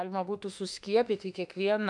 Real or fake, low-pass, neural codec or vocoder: fake; 10.8 kHz; autoencoder, 48 kHz, 32 numbers a frame, DAC-VAE, trained on Japanese speech